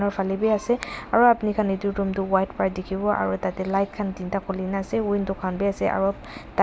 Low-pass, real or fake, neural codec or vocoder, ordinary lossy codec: none; real; none; none